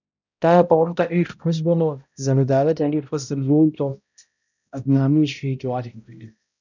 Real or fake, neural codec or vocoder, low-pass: fake; codec, 16 kHz, 0.5 kbps, X-Codec, HuBERT features, trained on balanced general audio; 7.2 kHz